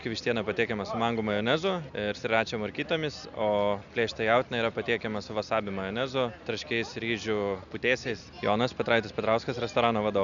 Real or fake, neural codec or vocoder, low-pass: real; none; 7.2 kHz